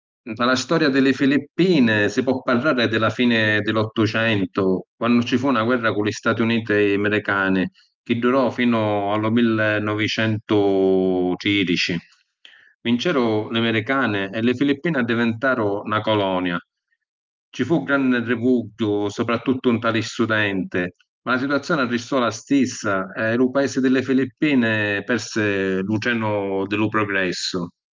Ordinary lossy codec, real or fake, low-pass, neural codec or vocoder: Opus, 32 kbps; real; 7.2 kHz; none